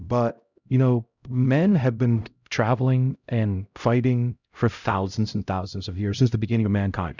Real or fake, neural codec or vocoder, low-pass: fake; codec, 16 kHz, 0.5 kbps, X-Codec, HuBERT features, trained on LibriSpeech; 7.2 kHz